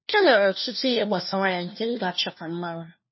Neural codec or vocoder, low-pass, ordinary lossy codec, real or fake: codec, 16 kHz, 1 kbps, FunCodec, trained on LibriTTS, 50 frames a second; 7.2 kHz; MP3, 24 kbps; fake